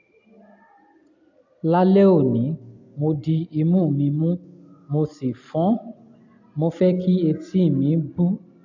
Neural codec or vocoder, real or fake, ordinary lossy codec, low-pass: none; real; AAC, 48 kbps; 7.2 kHz